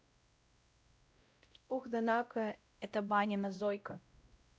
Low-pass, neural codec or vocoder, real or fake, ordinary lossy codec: none; codec, 16 kHz, 0.5 kbps, X-Codec, WavLM features, trained on Multilingual LibriSpeech; fake; none